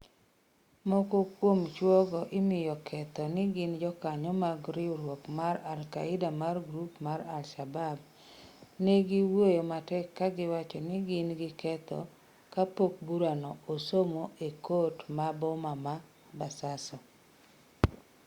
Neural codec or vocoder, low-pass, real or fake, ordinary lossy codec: none; 19.8 kHz; real; Opus, 64 kbps